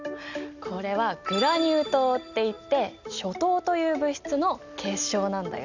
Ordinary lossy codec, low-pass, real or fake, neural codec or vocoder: Opus, 64 kbps; 7.2 kHz; real; none